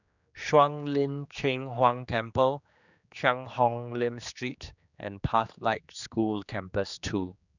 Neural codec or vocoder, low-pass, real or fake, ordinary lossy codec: codec, 16 kHz, 2 kbps, X-Codec, HuBERT features, trained on general audio; 7.2 kHz; fake; none